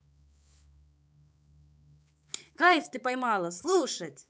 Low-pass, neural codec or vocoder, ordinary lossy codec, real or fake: none; codec, 16 kHz, 4 kbps, X-Codec, HuBERT features, trained on balanced general audio; none; fake